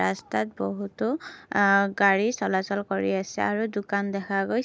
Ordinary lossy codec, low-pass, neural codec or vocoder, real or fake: none; none; none; real